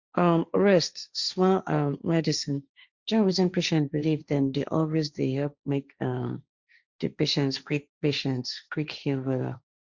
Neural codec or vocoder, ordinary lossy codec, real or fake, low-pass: codec, 16 kHz, 1.1 kbps, Voila-Tokenizer; Opus, 64 kbps; fake; 7.2 kHz